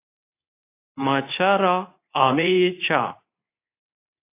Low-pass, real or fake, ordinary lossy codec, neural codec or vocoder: 3.6 kHz; fake; AAC, 24 kbps; codec, 24 kHz, 0.9 kbps, WavTokenizer, medium speech release version 2